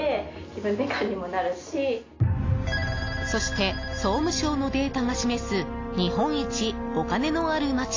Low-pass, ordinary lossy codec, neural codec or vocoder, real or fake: 7.2 kHz; AAC, 32 kbps; none; real